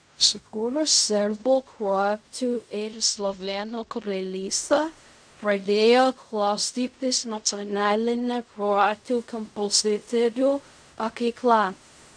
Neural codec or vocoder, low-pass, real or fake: codec, 16 kHz in and 24 kHz out, 0.4 kbps, LongCat-Audio-Codec, fine tuned four codebook decoder; 9.9 kHz; fake